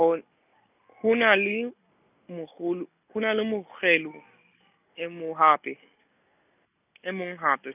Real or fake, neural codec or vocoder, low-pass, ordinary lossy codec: real; none; 3.6 kHz; none